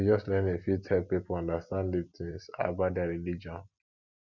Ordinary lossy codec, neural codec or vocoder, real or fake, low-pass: Opus, 64 kbps; none; real; 7.2 kHz